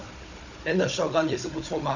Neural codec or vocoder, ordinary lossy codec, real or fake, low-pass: codec, 16 kHz, 16 kbps, FunCodec, trained on Chinese and English, 50 frames a second; none; fake; 7.2 kHz